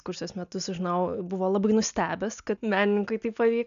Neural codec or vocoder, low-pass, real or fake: none; 7.2 kHz; real